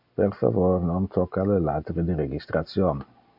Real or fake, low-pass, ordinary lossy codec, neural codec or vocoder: real; 5.4 kHz; AAC, 48 kbps; none